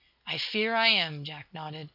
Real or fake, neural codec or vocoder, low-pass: real; none; 5.4 kHz